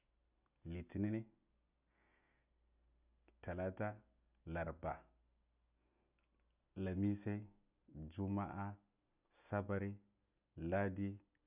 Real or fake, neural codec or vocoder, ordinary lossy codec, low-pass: real; none; none; 3.6 kHz